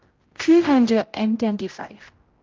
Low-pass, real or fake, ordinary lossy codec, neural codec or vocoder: 7.2 kHz; fake; Opus, 24 kbps; codec, 16 kHz, 0.5 kbps, X-Codec, HuBERT features, trained on general audio